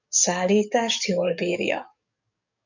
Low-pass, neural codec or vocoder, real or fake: 7.2 kHz; codec, 44.1 kHz, 7.8 kbps, DAC; fake